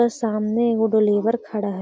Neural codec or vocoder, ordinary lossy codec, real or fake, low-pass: none; none; real; none